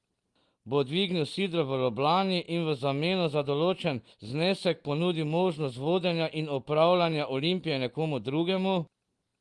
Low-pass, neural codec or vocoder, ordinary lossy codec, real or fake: 10.8 kHz; none; Opus, 24 kbps; real